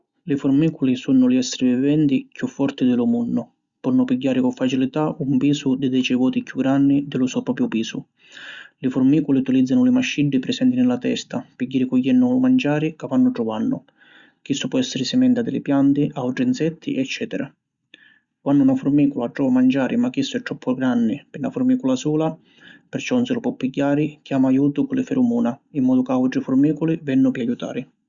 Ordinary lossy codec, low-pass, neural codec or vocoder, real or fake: Opus, 64 kbps; 7.2 kHz; none; real